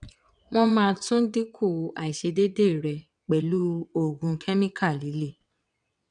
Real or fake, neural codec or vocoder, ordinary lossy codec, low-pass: fake; vocoder, 22.05 kHz, 80 mel bands, WaveNeXt; none; 9.9 kHz